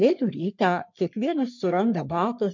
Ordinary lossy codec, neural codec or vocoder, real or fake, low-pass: MP3, 64 kbps; codec, 44.1 kHz, 3.4 kbps, Pupu-Codec; fake; 7.2 kHz